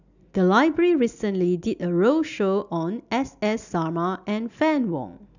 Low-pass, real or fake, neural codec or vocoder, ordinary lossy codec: 7.2 kHz; real; none; none